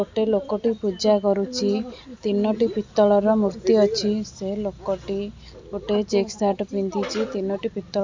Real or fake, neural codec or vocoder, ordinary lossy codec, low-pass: real; none; MP3, 64 kbps; 7.2 kHz